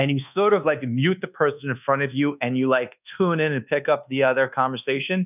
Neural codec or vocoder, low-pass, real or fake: codec, 24 kHz, 1.2 kbps, DualCodec; 3.6 kHz; fake